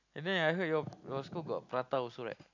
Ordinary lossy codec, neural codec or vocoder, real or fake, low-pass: none; none; real; 7.2 kHz